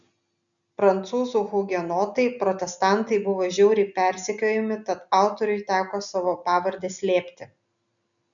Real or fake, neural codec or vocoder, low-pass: real; none; 7.2 kHz